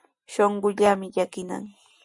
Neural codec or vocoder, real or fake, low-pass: none; real; 10.8 kHz